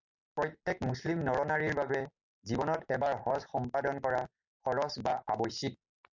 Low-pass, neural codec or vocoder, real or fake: 7.2 kHz; none; real